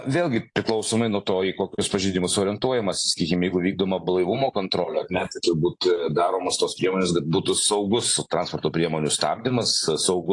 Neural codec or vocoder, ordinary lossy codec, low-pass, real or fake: none; AAC, 48 kbps; 10.8 kHz; real